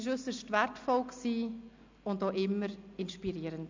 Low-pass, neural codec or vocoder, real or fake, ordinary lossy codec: 7.2 kHz; none; real; MP3, 64 kbps